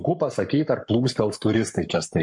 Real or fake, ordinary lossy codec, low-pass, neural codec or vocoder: fake; MP3, 48 kbps; 10.8 kHz; codec, 44.1 kHz, 7.8 kbps, Pupu-Codec